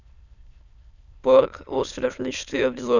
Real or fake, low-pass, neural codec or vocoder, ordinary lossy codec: fake; 7.2 kHz; autoencoder, 22.05 kHz, a latent of 192 numbers a frame, VITS, trained on many speakers; none